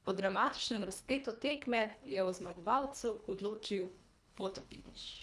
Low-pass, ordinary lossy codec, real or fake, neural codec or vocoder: none; none; fake; codec, 24 kHz, 1.5 kbps, HILCodec